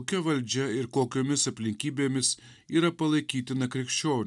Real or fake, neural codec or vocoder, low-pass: real; none; 10.8 kHz